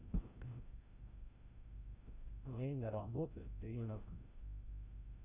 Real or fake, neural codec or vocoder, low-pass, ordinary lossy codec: fake; codec, 16 kHz, 0.5 kbps, FreqCodec, larger model; 3.6 kHz; Opus, 64 kbps